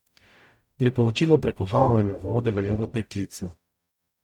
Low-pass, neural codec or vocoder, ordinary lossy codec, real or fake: 19.8 kHz; codec, 44.1 kHz, 0.9 kbps, DAC; none; fake